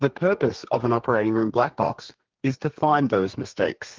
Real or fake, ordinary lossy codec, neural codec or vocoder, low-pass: fake; Opus, 16 kbps; codec, 32 kHz, 1.9 kbps, SNAC; 7.2 kHz